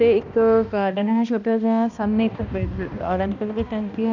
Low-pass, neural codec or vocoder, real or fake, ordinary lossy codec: 7.2 kHz; codec, 16 kHz, 1 kbps, X-Codec, HuBERT features, trained on balanced general audio; fake; none